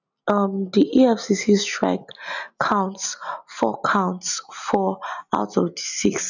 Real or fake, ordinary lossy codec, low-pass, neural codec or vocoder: real; none; 7.2 kHz; none